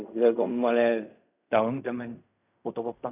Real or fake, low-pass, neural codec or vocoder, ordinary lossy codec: fake; 3.6 kHz; codec, 16 kHz in and 24 kHz out, 0.4 kbps, LongCat-Audio-Codec, fine tuned four codebook decoder; none